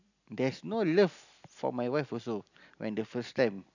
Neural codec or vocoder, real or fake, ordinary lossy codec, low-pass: none; real; none; 7.2 kHz